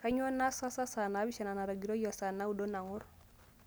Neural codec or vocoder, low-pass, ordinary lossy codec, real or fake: none; none; none; real